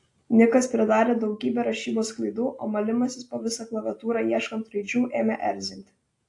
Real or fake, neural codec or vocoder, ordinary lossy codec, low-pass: real; none; AAC, 48 kbps; 10.8 kHz